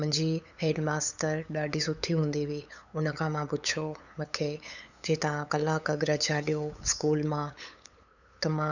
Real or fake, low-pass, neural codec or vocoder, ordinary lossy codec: fake; 7.2 kHz; codec, 16 kHz, 8 kbps, FunCodec, trained on LibriTTS, 25 frames a second; none